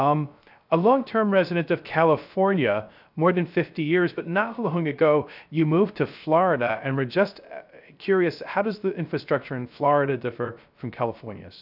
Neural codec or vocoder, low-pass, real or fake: codec, 16 kHz, 0.3 kbps, FocalCodec; 5.4 kHz; fake